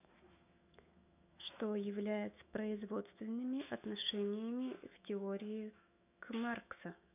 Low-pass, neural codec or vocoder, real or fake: 3.6 kHz; autoencoder, 48 kHz, 128 numbers a frame, DAC-VAE, trained on Japanese speech; fake